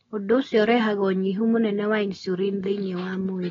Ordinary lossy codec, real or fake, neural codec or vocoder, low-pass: AAC, 24 kbps; real; none; 7.2 kHz